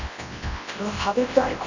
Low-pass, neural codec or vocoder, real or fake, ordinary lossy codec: 7.2 kHz; codec, 24 kHz, 0.9 kbps, WavTokenizer, large speech release; fake; none